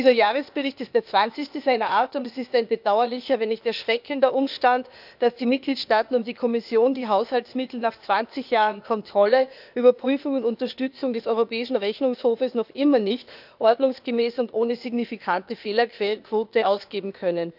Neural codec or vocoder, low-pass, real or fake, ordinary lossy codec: codec, 16 kHz, 0.8 kbps, ZipCodec; 5.4 kHz; fake; none